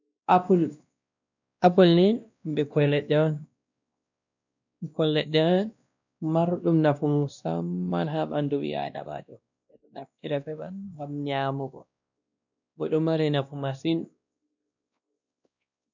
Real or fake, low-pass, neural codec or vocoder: fake; 7.2 kHz; codec, 16 kHz, 1 kbps, X-Codec, WavLM features, trained on Multilingual LibriSpeech